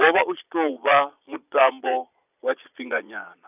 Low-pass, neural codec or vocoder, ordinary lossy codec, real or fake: 3.6 kHz; vocoder, 22.05 kHz, 80 mel bands, Vocos; none; fake